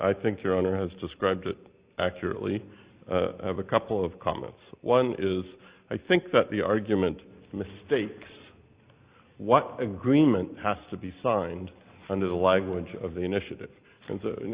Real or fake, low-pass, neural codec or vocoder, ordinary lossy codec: real; 3.6 kHz; none; Opus, 64 kbps